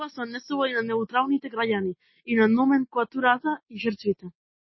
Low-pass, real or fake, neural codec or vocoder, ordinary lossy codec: 7.2 kHz; real; none; MP3, 24 kbps